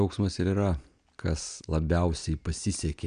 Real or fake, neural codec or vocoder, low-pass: real; none; 9.9 kHz